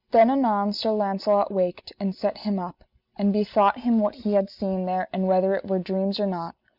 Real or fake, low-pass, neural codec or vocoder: real; 5.4 kHz; none